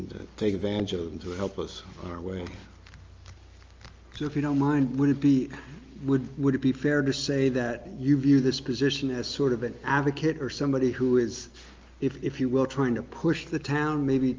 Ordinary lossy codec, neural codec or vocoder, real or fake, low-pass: Opus, 24 kbps; none; real; 7.2 kHz